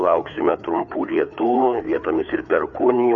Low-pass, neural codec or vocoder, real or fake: 7.2 kHz; codec, 16 kHz, 4 kbps, FreqCodec, larger model; fake